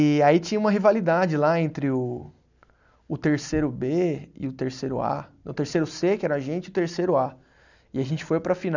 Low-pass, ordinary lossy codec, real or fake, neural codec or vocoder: 7.2 kHz; none; real; none